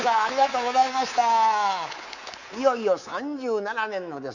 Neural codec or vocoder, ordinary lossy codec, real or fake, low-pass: codec, 24 kHz, 3.1 kbps, DualCodec; none; fake; 7.2 kHz